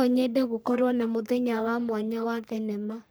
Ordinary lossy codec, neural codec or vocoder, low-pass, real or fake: none; codec, 44.1 kHz, 3.4 kbps, Pupu-Codec; none; fake